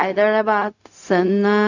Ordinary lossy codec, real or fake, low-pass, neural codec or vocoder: none; fake; 7.2 kHz; codec, 16 kHz, 0.4 kbps, LongCat-Audio-Codec